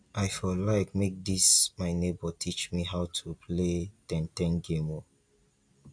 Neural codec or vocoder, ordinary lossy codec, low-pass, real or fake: none; AAC, 64 kbps; 9.9 kHz; real